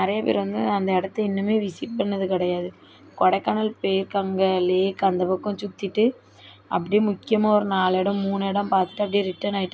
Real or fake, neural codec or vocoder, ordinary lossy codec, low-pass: real; none; none; none